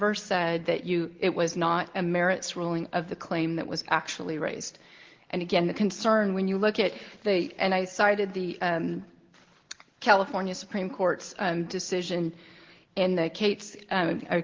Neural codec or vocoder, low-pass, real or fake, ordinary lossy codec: none; 7.2 kHz; real; Opus, 16 kbps